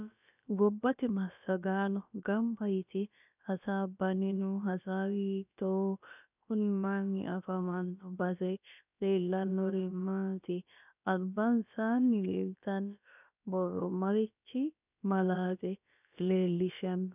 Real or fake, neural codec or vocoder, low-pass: fake; codec, 16 kHz, about 1 kbps, DyCAST, with the encoder's durations; 3.6 kHz